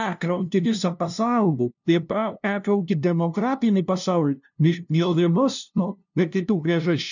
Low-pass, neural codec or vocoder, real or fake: 7.2 kHz; codec, 16 kHz, 0.5 kbps, FunCodec, trained on LibriTTS, 25 frames a second; fake